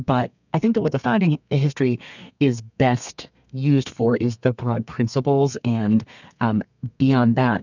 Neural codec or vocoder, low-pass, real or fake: codec, 32 kHz, 1.9 kbps, SNAC; 7.2 kHz; fake